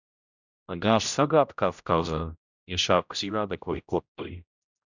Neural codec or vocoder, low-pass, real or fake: codec, 16 kHz, 0.5 kbps, X-Codec, HuBERT features, trained on general audio; 7.2 kHz; fake